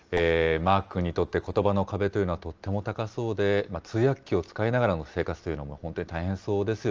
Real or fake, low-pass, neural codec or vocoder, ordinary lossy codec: real; 7.2 kHz; none; Opus, 24 kbps